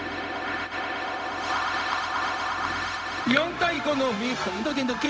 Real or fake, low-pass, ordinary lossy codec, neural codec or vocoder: fake; none; none; codec, 16 kHz, 0.4 kbps, LongCat-Audio-Codec